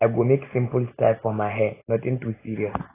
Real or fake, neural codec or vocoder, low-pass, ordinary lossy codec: real; none; 3.6 kHz; AAC, 16 kbps